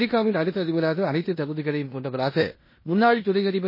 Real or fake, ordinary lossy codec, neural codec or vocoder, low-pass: fake; MP3, 24 kbps; codec, 16 kHz in and 24 kHz out, 0.9 kbps, LongCat-Audio-Codec, four codebook decoder; 5.4 kHz